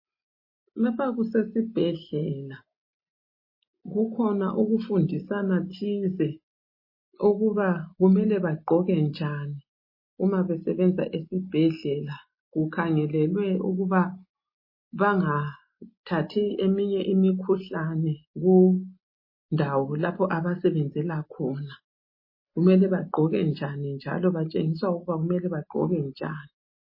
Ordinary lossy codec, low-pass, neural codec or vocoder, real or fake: MP3, 24 kbps; 5.4 kHz; none; real